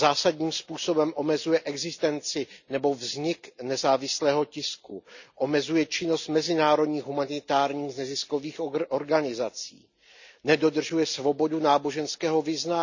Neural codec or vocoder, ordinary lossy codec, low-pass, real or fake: none; none; 7.2 kHz; real